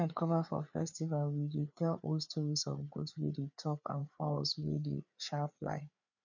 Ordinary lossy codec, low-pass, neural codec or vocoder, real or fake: none; 7.2 kHz; codec, 16 kHz, 4 kbps, FreqCodec, larger model; fake